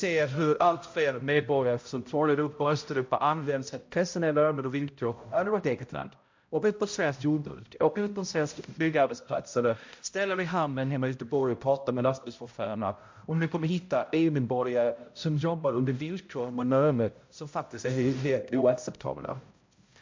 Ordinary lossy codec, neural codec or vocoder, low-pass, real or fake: MP3, 48 kbps; codec, 16 kHz, 0.5 kbps, X-Codec, HuBERT features, trained on balanced general audio; 7.2 kHz; fake